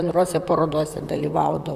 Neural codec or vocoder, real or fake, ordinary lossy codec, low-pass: vocoder, 44.1 kHz, 128 mel bands, Pupu-Vocoder; fake; Opus, 64 kbps; 14.4 kHz